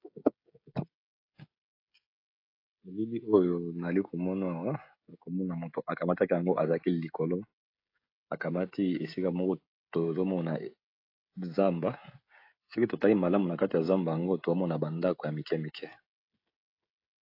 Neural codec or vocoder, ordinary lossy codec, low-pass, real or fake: codec, 16 kHz, 16 kbps, FreqCodec, smaller model; AAC, 32 kbps; 5.4 kHz; fake